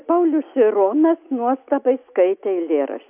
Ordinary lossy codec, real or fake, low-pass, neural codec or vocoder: AAC, 32 kbps; real; 3.6 kHz; none